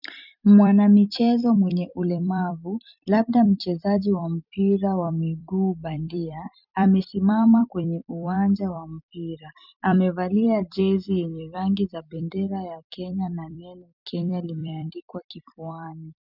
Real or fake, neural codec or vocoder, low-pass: fake; vocoder, 44.1 kHz, 128 mel bands every 256 samples, BigVGAN v2; 5.4 kHz